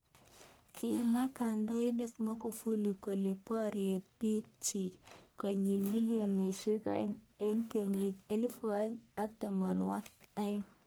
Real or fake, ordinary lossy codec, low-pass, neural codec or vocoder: fake; none; none; codec, 44.1 kHz, 1.7 kbps, Pupu-Codec